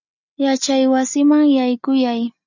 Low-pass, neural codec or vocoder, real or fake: 7.2 kHz; none; real